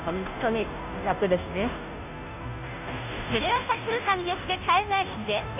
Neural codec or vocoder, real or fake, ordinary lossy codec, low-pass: codec, 16 kHz, 0.5 kbps, FunCodec, trained on Chinese and English, 25 frames a second; fake; none; 3.6 kHz